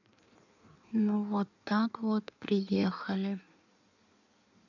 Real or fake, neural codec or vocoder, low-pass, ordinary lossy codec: fake; codec, 16 kHz in and 24 kHz out, 1.1 kbps, FireRedTTS-2 codec; 7.2 kHz; none